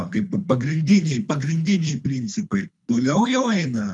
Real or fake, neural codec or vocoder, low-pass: fake; codec, 24 kHz, 3 kbps, HILCodec; 10.8 kHz